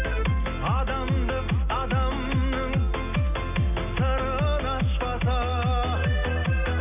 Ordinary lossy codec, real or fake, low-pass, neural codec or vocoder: none; real; 3.6 kHz; none